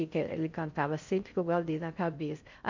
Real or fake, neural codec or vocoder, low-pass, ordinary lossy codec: fake; codec, 16 kHz in and 24 kHz out, 0.6 kbps, FocalCodec, streaming, 4096 codes; 7.2 kHz; MP3, 64 kbps